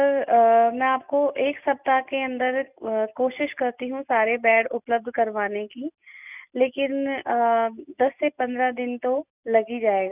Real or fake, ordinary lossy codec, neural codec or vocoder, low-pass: real; none; none; 3.6 kHz